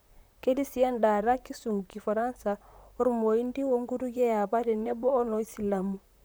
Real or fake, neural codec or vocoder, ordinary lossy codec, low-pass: fake; vocoder, 44.1 kHz, 128 mel bands, Pupu-Vocoder; none; none